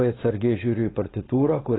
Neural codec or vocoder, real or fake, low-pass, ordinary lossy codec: codec, 16 kHz, 4.8 kbps, FACodec; fake; 7.2 kHz; AAC, 16 kbps